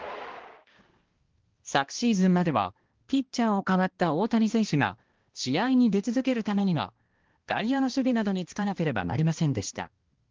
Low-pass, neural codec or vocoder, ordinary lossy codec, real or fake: 7.2 kHz; codec, 16 kHz, 1 kbps, X-Codec, HuBERT features, trained on balanced general audio; Opus, 16 kbps; fake